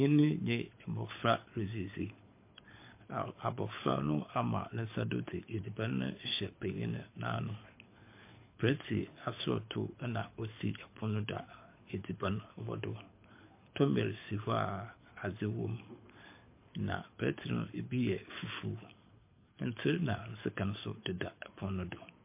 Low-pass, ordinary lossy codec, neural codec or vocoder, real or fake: 3.6 kHz; MP3, 24 kbps; codec, 24 kHz, 6 kbps, HILCodec; fake